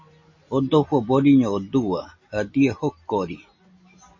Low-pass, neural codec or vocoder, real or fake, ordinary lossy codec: 7.2 kHz; none; real; MP3, 32 kbps